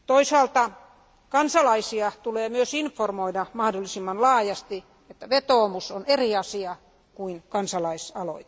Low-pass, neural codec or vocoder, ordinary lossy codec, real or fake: none; none; none; real